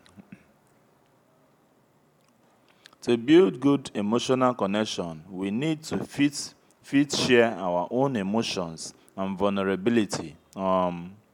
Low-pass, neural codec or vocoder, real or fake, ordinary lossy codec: 19.8 kHz; none; real; MP3, 96 kbps